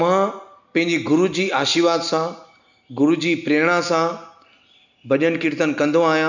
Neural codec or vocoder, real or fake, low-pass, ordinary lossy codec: none; real; 7.2 kHz; none